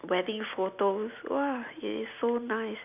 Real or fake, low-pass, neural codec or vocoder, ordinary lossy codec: real; 3.6 kHz; none; none